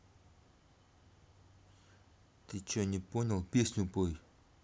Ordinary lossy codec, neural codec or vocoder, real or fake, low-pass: none; none; real; none